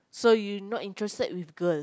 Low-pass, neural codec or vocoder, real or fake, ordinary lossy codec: none; none; real; none